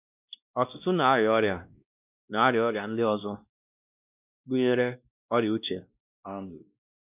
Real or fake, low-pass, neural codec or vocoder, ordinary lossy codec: fake; 3.6 kHz; codec, 16 kHz, 1 kbps, X-Codec, WavLM features, trained on Multilingual LibriSpeech; none